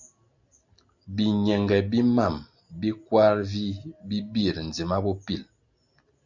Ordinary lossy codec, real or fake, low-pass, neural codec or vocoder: Opus, 64 kbps; real; 7.2 kHz; none